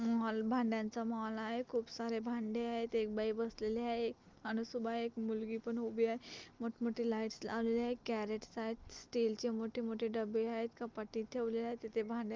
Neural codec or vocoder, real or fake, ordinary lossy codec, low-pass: none; real; Opus, 24 kbps; 7.2 kHz